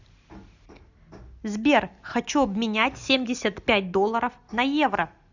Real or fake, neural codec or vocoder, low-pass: real; none; 7.2 kHz